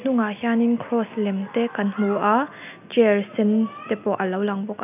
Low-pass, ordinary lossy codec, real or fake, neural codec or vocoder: 3.6 kHz; none; real; none